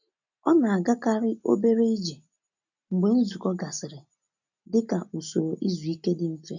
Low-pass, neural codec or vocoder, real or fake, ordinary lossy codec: 7.2 kHz; none; real; none